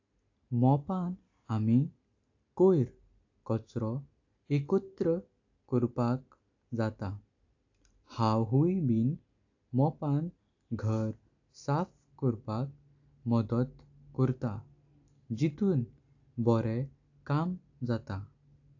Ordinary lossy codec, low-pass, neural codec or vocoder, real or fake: AAC, 48 kbps; 7.2 kHz; none; real